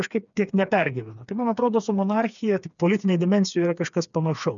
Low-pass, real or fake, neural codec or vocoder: 7.2 kHz; fake; codec, 16 kHz, 4 kbps, FreqCodec, smaller model